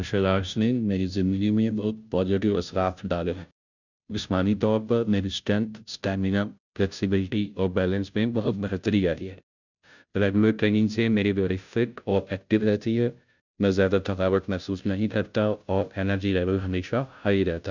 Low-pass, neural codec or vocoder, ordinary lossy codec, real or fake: 7.2 kHz; codec, 16 kHz, 0.5 kbps, FunCodec, trained on Chinese and English, 25 frames a second; none; fake